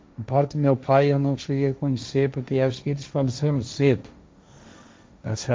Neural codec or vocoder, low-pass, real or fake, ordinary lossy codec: codec, 16 kHz, 1.1 kbps, Voila-Tokenizer; none; fake; none